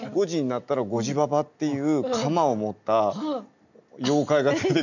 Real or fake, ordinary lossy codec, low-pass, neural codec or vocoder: real; none; 7.2 kHz; none